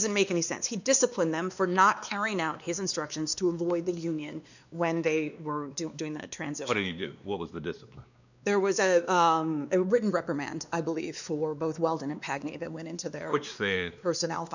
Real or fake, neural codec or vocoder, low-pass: fake; codec, 16 kHz, 2 kbps, X-Codec, WavLM features, trained on Multilingual LibriSpeech; 7.2 kHz